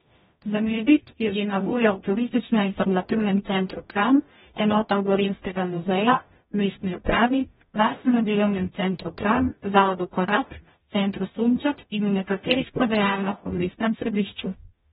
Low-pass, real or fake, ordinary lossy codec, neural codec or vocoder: 19.8 kHz; fake; AAC, 16 kbps; codec, 44.1 kHz, 0.9 kbps, DAC